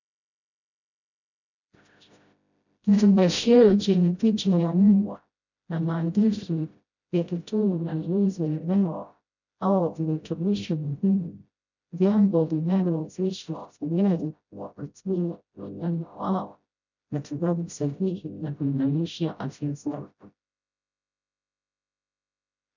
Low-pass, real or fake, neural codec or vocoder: 7.2 kHz; fake; codec, 16 kHz, 0.5 kbps, FreqCodec, smaller model